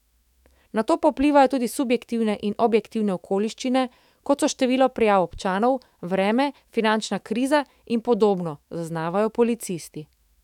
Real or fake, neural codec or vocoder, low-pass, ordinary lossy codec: fake; autoencoder, 48 kHz, 128 numbers a frame, DAC-VAE, trained on Japanese speech; 19.8 kHz; none